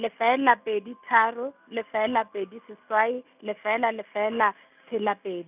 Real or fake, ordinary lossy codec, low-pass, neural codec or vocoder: real; none; 3.6 kHz; none